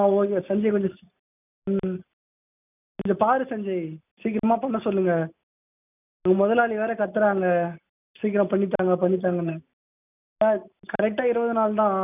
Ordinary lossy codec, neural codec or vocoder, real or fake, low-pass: none; none; real; 3.6 kHz